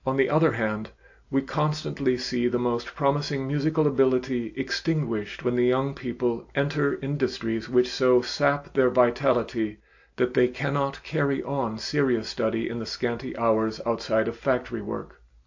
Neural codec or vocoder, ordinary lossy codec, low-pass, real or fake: none; AAC, 48 kbps; 7.2 kHz; real